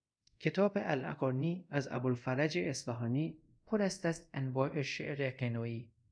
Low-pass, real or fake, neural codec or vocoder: 9.9 kHz; fake; codec, 24 kHz, 0.5 kbps, DualCodec